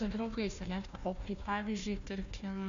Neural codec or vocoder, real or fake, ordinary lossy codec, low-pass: codec, 16 kHz, 1 kbps, FunCodec, trained on Chinese and English, 50 frames a second; fake; AAC, 64 kbps; 7.2 kHz